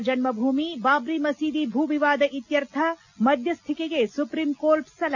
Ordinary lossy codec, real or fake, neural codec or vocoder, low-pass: none; real; none; 7.2 kHz